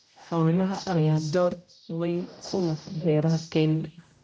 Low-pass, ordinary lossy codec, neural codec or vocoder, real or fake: none; none; codec, 16 kHz, 0.5 kbps, X-Codec, HuBERT features, trained on general audio; fake